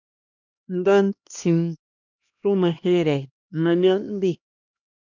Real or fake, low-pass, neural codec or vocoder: fake; 7.2 kHz; codec, 16 kHz, 1 kbps, X-Codec, HuBERT features, trained on LibriSpeech